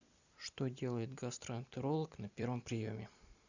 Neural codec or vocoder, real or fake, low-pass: vocoder, 44.1 kHz, 128 mel bands every 256 samples, BigVGAN v2; fake; 7.2 kHz